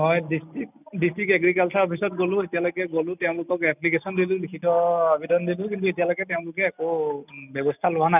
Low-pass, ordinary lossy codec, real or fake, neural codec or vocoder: 3.6 kHz; none; real; none